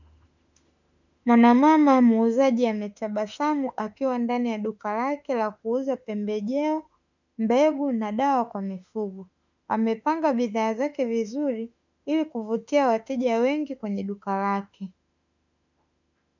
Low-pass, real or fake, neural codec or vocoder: 7.2 kHz; fake; autoencoder, 48 kHz, 32 numbers a frame, DAC-VAE, trained on Japanese speech